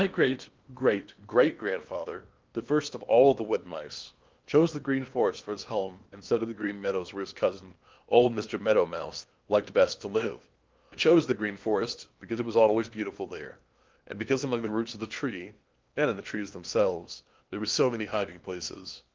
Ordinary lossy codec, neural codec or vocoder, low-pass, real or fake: Opus, 32 kbps; codec, 16 kHz in and 24 kHz out, 0.8 kbps, FocalCodec, streaming, 65536 codes; 7.2 kHz; fake